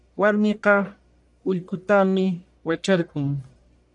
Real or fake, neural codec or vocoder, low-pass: fake; codec, 44.1 kHz, 1.7 kbps, Pupu-Codec; 10.8 kHz